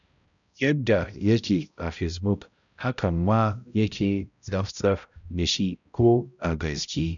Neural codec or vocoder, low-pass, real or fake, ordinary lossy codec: codec, 16 kHz, 0.5 kbps, X-Codec, HuBERT features, trained on balanced general audio; 7.2 kHz; fake; none